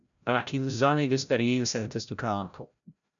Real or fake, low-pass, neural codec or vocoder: fake; 7.2 kHz; codec, 16 kHz, 0.5 kbps, FreqCodec, larger model